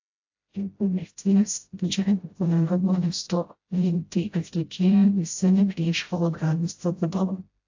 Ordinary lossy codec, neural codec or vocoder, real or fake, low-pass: AAC, 48 kbps; codec, 16 kHz, 0.5 kbps, FreqCodec, smaller model; fake; 7.2 kHz